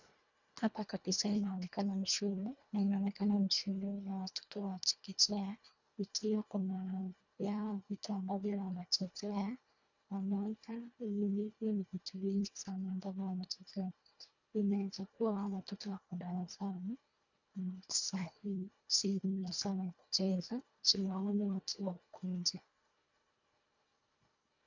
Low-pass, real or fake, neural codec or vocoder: 7.2 kHz; fake; codec, 24 kHz, 1.5 kbps, HILCodec